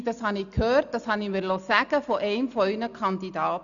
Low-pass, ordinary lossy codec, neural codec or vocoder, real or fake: 7.2 kHz; none; none; real